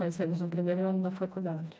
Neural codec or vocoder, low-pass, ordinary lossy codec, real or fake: codec, 16 kHz, 1 kbps, FreqCodec, smaller model; none; none; fake